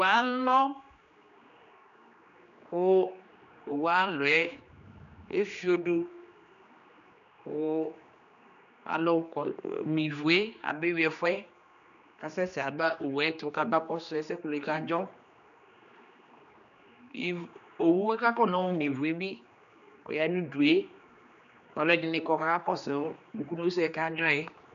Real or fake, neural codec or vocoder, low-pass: fake; codec, 16 kHz, 2 kbps, X-Codec, HuBERT features, trained on general audio; 7.2 kHz